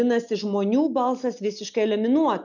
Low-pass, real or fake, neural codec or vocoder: 7.2 kHz; real; none